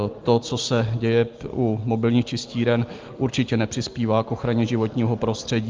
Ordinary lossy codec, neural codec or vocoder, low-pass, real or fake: Opus, 32 kbps; none; 7.2 kHz; real